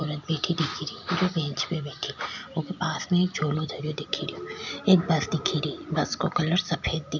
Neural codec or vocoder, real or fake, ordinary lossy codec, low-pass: none; real; none; 7.2 kHz